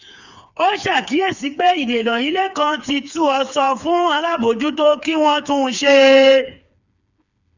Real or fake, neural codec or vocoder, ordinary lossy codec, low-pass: fake; codec, 16 kHz, 4 kbps, FreqCodec, smaller model; none; 7.2 kHz